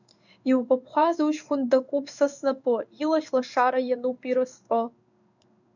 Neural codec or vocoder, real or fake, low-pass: codec, 16 kHz in and 24 kHz out, 1 kbps, XY-Tokenizer; fake; 7.2 kHz